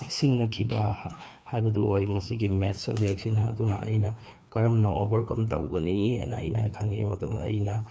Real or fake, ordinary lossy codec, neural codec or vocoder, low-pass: fake; none; codec, 16 kHz, 2 kbps, FreqCodec, larger model; none